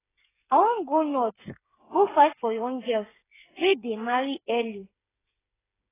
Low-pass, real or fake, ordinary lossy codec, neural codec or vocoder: 3.6 kHz; fake; AAC, 16 kbps; codec, 16 kHz, 8 kbps, FreqCodec, smaller model